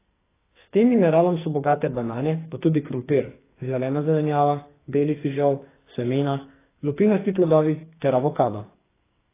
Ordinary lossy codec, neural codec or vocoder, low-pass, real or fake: AAC, 16 kbps; codec, 44.1 kHz, 2.6 kbps, SNAC; 3.6 kHz; fake